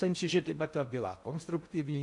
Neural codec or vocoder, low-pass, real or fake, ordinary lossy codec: codec, 16 kHz in and 24 kHz out, 0.6 kbps, FocalCodec, streaming, 4096 codes; 10.8 kHz; fake; AAC, 64 kbps